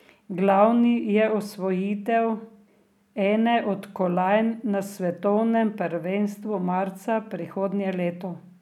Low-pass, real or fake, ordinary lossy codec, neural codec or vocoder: 19.8 kHz; real; none; none